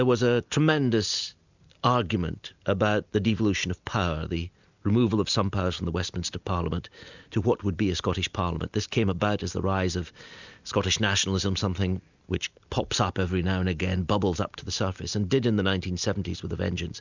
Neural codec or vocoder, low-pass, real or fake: none; 7.2 kHz; real